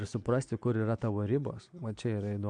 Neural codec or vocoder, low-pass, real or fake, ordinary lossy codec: none; 9.9 kHz; real; AAC, 64 kbps